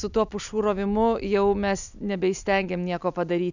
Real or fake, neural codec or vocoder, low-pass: real; none; 7.2 kHz